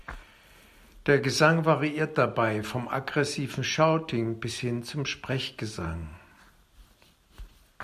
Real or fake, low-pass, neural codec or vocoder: real; 14.4 kHz; none